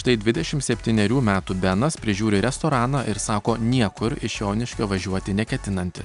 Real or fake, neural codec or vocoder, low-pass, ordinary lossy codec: real; none; 10.8 kHz; MP3, 96 kbps